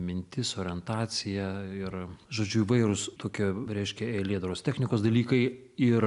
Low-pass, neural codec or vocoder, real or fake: 10.8 kHz; none; real